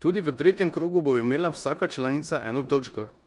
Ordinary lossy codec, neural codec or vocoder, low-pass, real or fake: none; codec, 16 kHz in and 24 kHz out, 0.9 kbps, LongCat-Audio-Codec, four codebook decoder; 10.8 kHz; fake